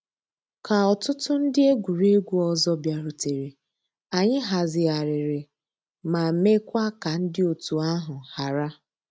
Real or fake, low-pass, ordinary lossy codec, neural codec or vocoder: real; none; none; none